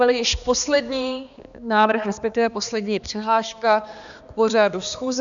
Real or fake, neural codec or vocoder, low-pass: fake; codec, 16 kHz, 2 kbps, X-Codec, HuBERT features, trained on balanced general audio; 7.2 kHz